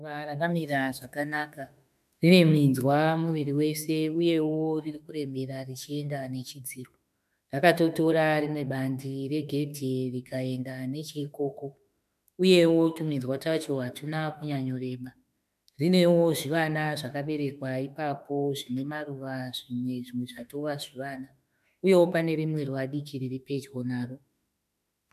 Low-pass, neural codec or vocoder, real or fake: 14.4 kHz; autoencoder, 48 kHz, 32 numbers a frame, DAC-VAE, trained on Japanese speech; fake